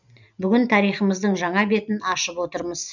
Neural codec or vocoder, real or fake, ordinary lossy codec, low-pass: none; real; none; 7.2 kHz